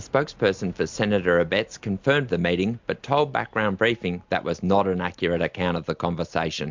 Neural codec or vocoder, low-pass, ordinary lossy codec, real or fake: none; 7.2 kHz; MP3, 64 kbps; real